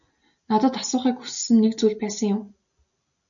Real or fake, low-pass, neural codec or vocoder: real; 7.2 kHz; none